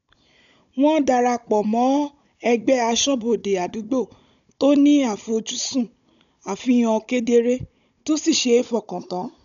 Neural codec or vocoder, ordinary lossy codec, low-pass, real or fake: codec, 16 kHz, 16 kbps, FunCodec, trained on Chinese and English, 50 frames a second; none; 7.2 kHz; fake